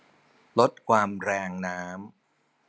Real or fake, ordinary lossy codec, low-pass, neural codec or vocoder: real; none; none; none